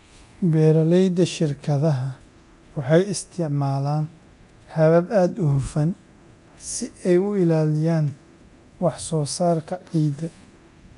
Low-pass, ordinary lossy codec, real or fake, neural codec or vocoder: 10.8 kHz; none; fake; codec, 24 kHz, 0.9 kbps, DualCodec